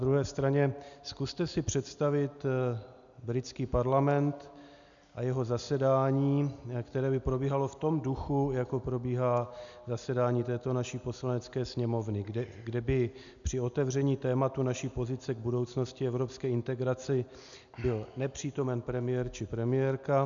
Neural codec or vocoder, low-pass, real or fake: none; 7.2 kHz; real